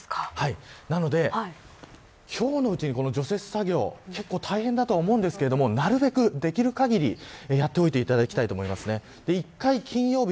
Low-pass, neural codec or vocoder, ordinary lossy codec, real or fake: none; none; none; real